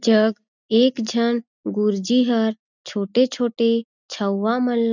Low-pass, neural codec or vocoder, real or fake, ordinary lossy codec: 7.2 kHz; none; real; none